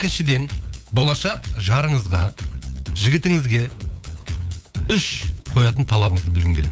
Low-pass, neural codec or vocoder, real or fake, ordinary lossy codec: none; codec, 16 kHz, 8 kbps, FunCodec, trained on LibriTTS, 25 frames a second; fake; none